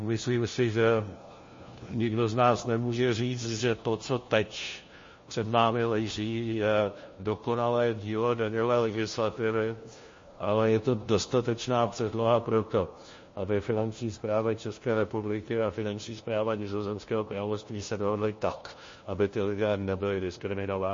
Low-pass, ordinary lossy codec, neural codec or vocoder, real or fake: 7.2 kHz; MP3, 32 kbps; codec, 16 kHz, 1 kbps, FunCodec, trained on LibriTTS, 50 frames a second; fake